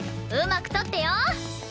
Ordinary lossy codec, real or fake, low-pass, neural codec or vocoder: none; real; none; none